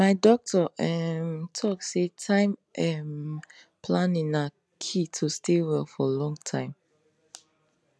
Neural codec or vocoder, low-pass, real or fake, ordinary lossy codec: none; none; real; none